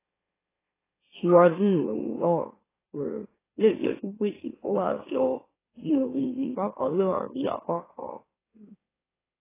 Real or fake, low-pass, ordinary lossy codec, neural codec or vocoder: fake; 3.6 kHz; AAC, 16 kbps; autoencoder, 44.1 kHz, a latent of 192 numbers a frame, MeloTTS